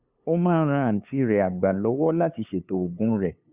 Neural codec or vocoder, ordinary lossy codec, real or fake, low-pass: codec, 16 kHz, 2 kbps, FunCodec, trained on LibriTTS, 25 frames a second; Opus, 64 kbps; fake; 3.6 kHz